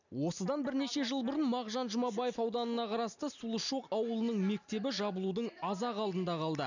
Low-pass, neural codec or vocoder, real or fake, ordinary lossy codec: 7.2 kHz; none; real; none